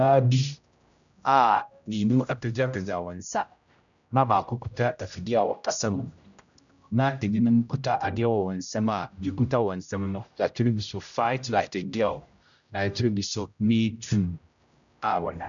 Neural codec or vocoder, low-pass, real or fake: codec, 16 kHz, 0.5 kbps, X-Codec, HuBERT features, trained on general audio; 7.2 kHz; fake